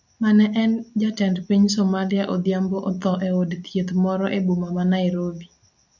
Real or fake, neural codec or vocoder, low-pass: real; none; 7.2 kHz